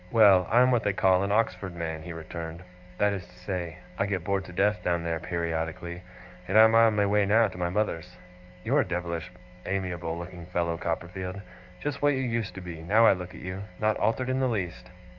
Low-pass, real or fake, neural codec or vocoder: 7.2 kHz; fake; codec, 44.1 kHz, 7.8 kbps, DAC